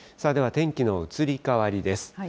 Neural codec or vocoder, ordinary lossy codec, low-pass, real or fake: none; none; none; real